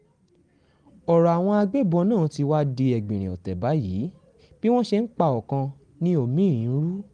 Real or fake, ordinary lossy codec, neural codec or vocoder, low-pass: real; Opus, 24 kbps; none; 9.9 kHz